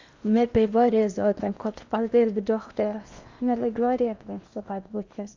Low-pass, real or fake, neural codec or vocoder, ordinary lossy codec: 7.2 kHz; fake; codec, 16 kHz in and 24 kHz out, 0.8 kbps, FocalCodec, streaming, 65536 codes; Opus, 64 kbps